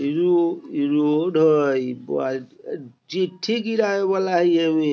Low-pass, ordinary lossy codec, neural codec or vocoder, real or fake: none; none; none; real